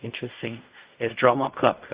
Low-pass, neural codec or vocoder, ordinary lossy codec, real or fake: 3.6 kHz; codec, 16 kHz in and 24 kHz out, 0.4 kbps, LongCat-Audio-Codec, fine tuned four codebook decoder; Opus, 64 kbps; fake